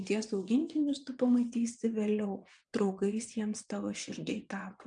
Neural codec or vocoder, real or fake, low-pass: vocoder, 22.05 kHz, 80 mel bands, WaveNeXt; fake; 9.9 kHz